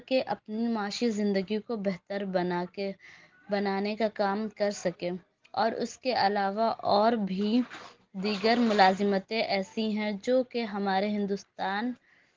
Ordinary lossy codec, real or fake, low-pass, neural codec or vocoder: Opus, 16 kbps; real; 7.2 kHz; none